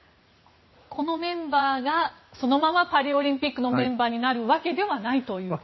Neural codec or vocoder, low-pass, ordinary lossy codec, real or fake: vocoder, 44.1 kHz, 80 mel bands, Vocos; 7.2 kHz; MP3, 24 kbps; fake